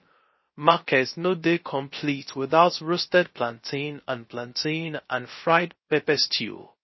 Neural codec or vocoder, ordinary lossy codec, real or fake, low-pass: codec, 16 kHz, 0.3 kbps, FocalCodec; MP3, 24 kbps; fake; 7.2 kHz